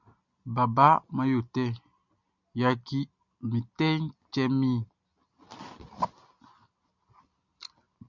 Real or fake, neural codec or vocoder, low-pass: real; none; 7.2 kHz